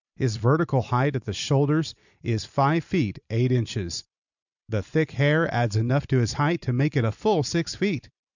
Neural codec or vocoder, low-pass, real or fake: vocoder, 44.1 kHz, 128 mel bands every 256 samples, BigVGAN v2; 7.2 kHz; fake